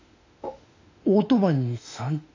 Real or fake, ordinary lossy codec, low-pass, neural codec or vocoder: fake; AAC, 48 kbps; 7.2 kHz; autoencoder, 48 kHz, 32 numbers a frame, DAC-VAE, trained on Japanese speech